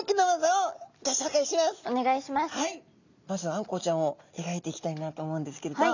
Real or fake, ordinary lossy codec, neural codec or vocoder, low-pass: real; none; none; 7.2 kHz